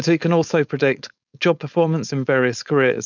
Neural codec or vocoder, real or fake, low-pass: codec, 16 kHz, 4.8 kbps, FACodec; fake; 7.2 kHz